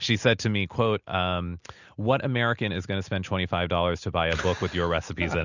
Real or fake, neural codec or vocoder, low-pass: real; none; 7.2 kHz